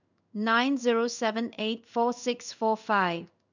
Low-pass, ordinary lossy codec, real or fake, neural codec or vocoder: 7.2 kHz; none; fake; codec, 16 kHz in and 24 kHz out, 1 kbps, XY-Tokenizer